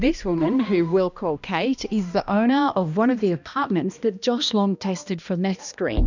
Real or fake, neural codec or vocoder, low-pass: fake; codec, 16 kHz, 1 kbps, X-Codec, HuBERT features, trained on balanced general audio; 7.2 kHz